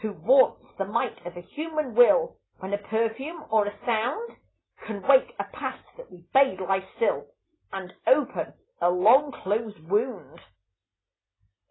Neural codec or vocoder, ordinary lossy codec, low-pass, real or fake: none; AAC, 16 kbps; 7.2 kHz; real